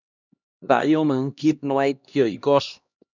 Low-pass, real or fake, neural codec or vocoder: 7.2 kHz; fake; codec, 16 kHz, 1 kbps, X-Codec, HuBERT features, trained on LibriSpeech